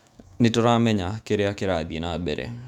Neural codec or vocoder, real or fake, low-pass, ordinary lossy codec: autoencoder, 48 kHz, 128 numbers a frame, DAC-VAE, trained on Japanese speech; fake; 19.8 kHz; none